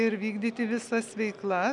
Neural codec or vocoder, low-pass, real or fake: none; 10.8 kHz; real